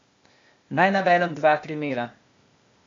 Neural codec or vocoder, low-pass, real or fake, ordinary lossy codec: codec, 16 kHz, 0.8 kbps, ZipCodec; 7.2 kHz; fake; MP3, 48 kbps